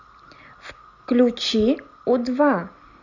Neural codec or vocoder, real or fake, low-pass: none; real; 7.2 kHz